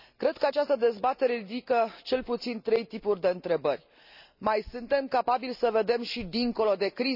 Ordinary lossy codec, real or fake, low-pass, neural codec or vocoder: none; real; 5.4 kHz; none